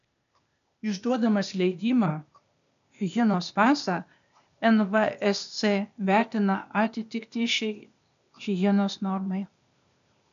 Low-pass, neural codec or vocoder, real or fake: 7.2 kHz; codec, 16 kHz, 0.8 kbps, ZipCodec; fake